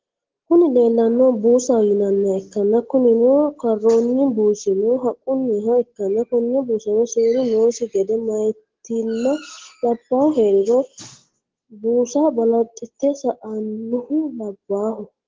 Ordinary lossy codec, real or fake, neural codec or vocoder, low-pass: Opus, 16 kbps; real; none; 7.2 kHz